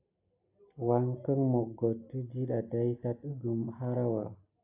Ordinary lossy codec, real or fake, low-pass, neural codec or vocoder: AAC, 24 kbps; real; 3.6 kHz; none